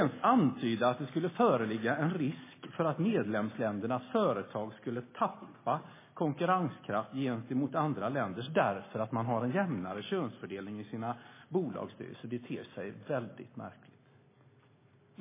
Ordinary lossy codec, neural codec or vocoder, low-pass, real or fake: MP3, 16 kbps; none; 3.6 kHz; real